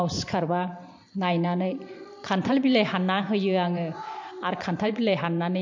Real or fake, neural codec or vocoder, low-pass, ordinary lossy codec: fake; vocoder, 44.1 kHz, 128 mel bands every 512 samples, BigVGAN v2; 7.2 kHz; MP3, 48 kbps